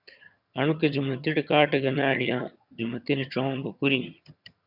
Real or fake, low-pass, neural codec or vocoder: fake; 5.4 kHz; vocoder, 22.05 kHz, 80 mel bands, HiFi-GAN